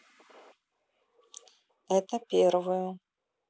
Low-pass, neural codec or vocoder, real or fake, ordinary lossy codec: none; none; real; none